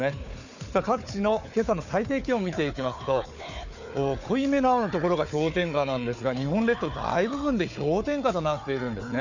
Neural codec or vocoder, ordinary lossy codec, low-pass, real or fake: codec, 16 kHz, 4 kbps, FunCodec, trained on Chinese and English, 50 frames a second; none; 7.2 kHz; fake